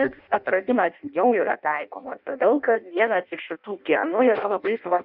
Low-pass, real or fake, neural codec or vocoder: 5.4 kHz; fake; codec, 16 kHz in and 24 kHz out, 0.6 kbps, FireRedTTS-2 codec